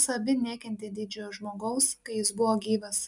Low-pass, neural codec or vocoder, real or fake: 10.8 kHz; none; real